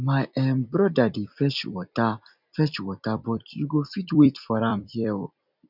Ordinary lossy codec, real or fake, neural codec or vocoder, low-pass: none; fake; vocoder, 44.1 kHz, 128 mel bands every 256 samples, BigVGAN v2; 5.4 kHz